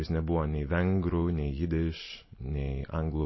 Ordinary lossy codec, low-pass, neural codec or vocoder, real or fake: MP3, 24 kbps; 7.2 kHz; none; real